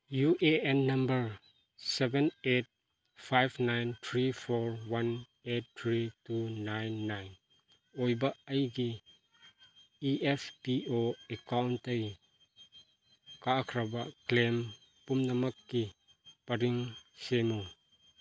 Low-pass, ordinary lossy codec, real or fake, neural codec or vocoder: none; none; real; none